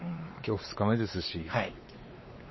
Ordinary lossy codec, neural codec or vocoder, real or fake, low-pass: MP3, 24 kbps; codec, 16 kHz, 4 kbps, X-Codec, WavLM features, trained on Multilingual LibriSpeech; fake; 7.2 kHz